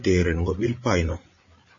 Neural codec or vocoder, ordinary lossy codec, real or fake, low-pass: codec, 16 kHz, 8 kbps, FreqCodec, larger model; MP3, 32 kbps; fake; 7.2 kHz